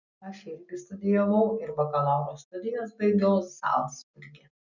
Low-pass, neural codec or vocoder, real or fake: 7.2 kHz; none; real